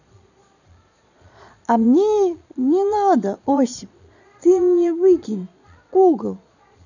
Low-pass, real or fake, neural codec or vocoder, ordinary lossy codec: 7.2 kHz; fake; vocoder, 22.05 kHz, 80 mel bands, Vocos; none